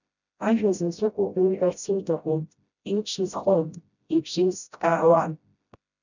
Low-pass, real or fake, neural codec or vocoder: 7.2 kHz; fake; codec, 16 kHz, 0.5 kbps, FreqCodec, smaller model